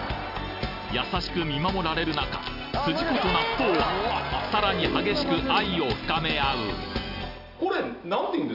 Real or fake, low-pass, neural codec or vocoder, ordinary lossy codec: real; 5.4 kHz; none; none